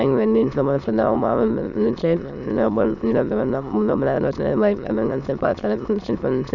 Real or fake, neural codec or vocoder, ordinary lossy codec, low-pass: fake; autoencoder, 22.05 kHz, a latent of 192 numbers a frame, VITS, trained on many speakers; none; 7.2 kHz